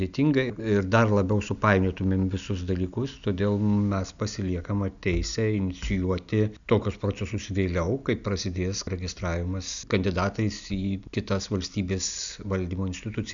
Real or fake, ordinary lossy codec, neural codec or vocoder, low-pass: real; MP3, 96 kbps; none; 7.2 kHz